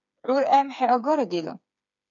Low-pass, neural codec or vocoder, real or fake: 7.2 kHz; codec, 16 kHz, 4 kbps, FreqCodec, smaller model; fake